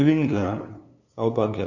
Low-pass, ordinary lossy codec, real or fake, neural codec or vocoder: 7.2 kHz; none; fake; codec, 16 kHz, 2 kbps, FunCodec, trained on LibriTTS, 25 frames a second